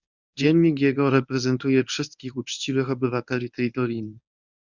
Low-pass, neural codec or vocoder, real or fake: 7.2 kHz; codec, 24 kHz, 0.9 kbps, WavTokenizer, medium speech release version 1; fake